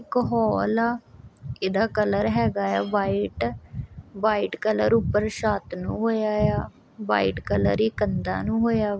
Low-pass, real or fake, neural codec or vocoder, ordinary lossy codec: none; real; none; none